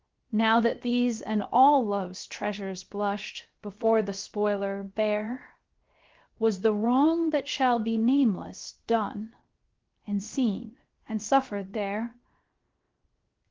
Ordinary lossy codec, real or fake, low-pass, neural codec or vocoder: Opus, 16 kbps; fake; 7.2 kHz; codec, 16 kHz, 0.7 kbps, FocalCodec